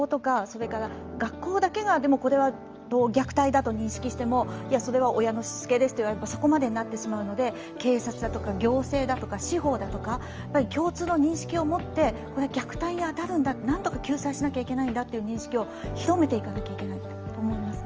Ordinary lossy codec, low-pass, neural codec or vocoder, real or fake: Opus, 24 kbps; 7.2 kHz; none; real